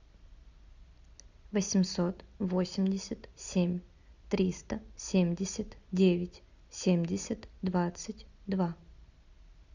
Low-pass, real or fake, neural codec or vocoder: 7.2 kHz; real; none